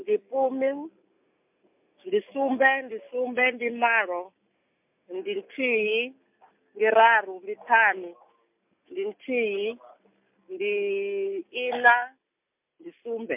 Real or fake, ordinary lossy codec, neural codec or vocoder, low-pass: real; MP3, 32 kbps; none; 3.6 kHz